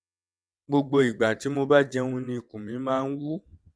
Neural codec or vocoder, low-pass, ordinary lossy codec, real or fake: vocoder, 22.05 kHz, 80 mel bands, WaveNeXt; none; none; fake